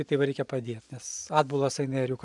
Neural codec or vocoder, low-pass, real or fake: vocoder, 44.1 kHz, 128 mel bands every 512 samples, BigVGAN v2; 10.8 kHz; fake